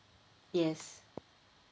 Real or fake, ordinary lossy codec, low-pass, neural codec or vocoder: real; none; none; none